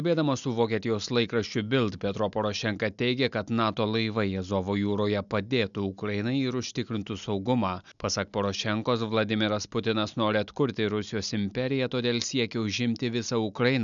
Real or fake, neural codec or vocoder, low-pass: real; none; 7.2 kHz